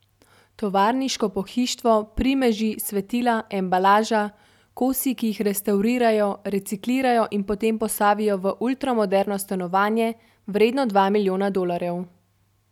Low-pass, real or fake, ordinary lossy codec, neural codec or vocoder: 19.8 kHz; real; none; none